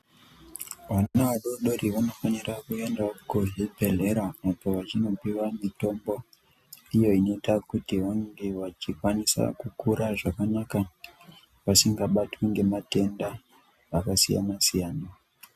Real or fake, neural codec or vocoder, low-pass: real; none; 14.4 kHz